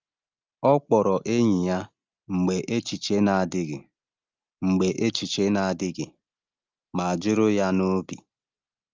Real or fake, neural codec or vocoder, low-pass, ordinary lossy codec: real; none; 7.2 kHz; Opus, 32 kbps